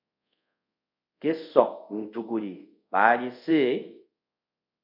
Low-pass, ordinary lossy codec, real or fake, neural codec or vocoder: 5.4 kHz; MP3, 48 kbps; fake; codec, 24 kHz, 0.5 kbps, DualCodec